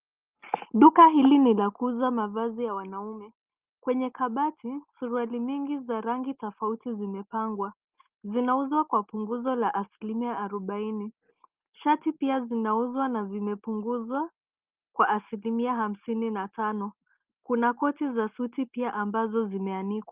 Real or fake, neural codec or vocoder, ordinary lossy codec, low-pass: real; none; Opus, 32 kbps; 3.6 kHz